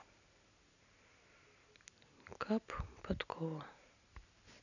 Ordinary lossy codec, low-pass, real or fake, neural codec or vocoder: none; 7.2 kHz; real; none